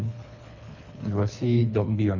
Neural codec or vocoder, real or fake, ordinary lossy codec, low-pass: codec, 24 kHz, 3 kbps, HILCodec; fake; none; 7.2 kHz